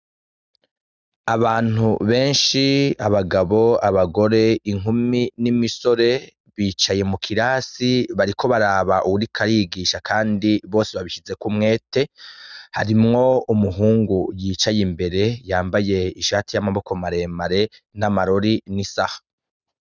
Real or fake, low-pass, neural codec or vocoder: real; 7.2 kHz; none